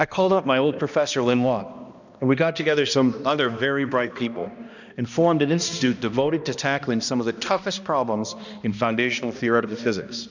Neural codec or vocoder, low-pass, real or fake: codec, 16 kHz, 1 kbps, X-Codec, HuBERT features, trained on balanced general audio; 7.2 kHz; fake